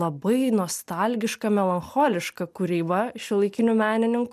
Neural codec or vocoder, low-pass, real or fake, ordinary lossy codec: none; 14.4 kHz; real; MP3, 96 kbps